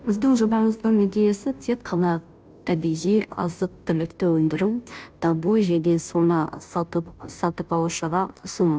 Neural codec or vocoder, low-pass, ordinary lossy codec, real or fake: codec, 16 kHz, 0.5 kbps, FunCodec, trained on Chinese and English, 25 frames a second; none; none; fake